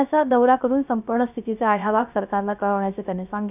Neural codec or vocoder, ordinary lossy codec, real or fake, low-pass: codec, 16 kHz, 0.3 kbps, FocalCodec; none; fake; 3.6 kHz